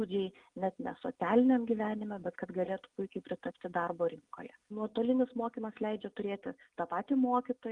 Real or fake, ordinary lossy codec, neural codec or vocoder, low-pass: real; Opus, 24 kbps; none; 10.8 kHz